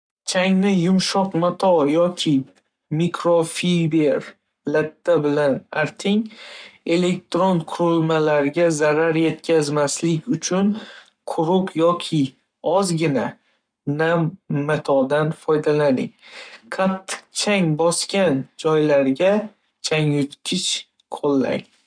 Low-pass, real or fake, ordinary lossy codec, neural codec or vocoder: 9.9 kHz; fake; none; codec, 44.1 kHz, 7.8 kbps, Pupu-Codec